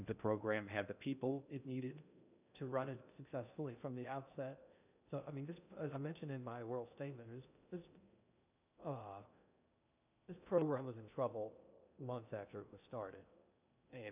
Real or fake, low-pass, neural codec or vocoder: fake; 3.6 kHz; codec, 16 kHz in and 24 kHz out, 0.6 kbps, FocalCodec, streaming, 2048 codes